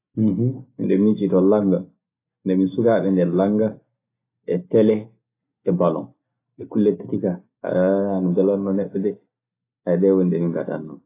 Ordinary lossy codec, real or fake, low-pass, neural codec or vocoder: AAC, 24 kbps; real; 3.6 kHz; none